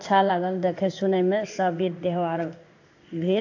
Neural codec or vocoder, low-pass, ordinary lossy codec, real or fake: codec, 16 kHz in and 24 kHz out, 1 kbps, XY-Tokenizer; 7.2 kHz; AAC, 48 kbps; fake